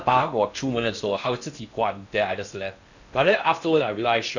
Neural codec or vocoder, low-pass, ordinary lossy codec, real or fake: codec, 16 kHz in and 24 kHz out, 0.6 kbps, FocalCodec, streaming, 4096 codes; 7.2 kHz; none; fake